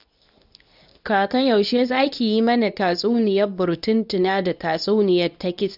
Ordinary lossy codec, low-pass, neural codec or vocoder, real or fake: none; 5.4 kHz; codec, 24 kHz, 0.9 kbps, WavTokenizer, medium speech release version 2; fake